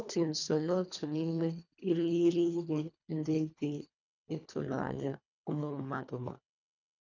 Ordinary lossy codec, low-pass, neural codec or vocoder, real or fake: none; 7.2 kHz; codec, 24 kHz, 1.5 kbps, HILCodec; fake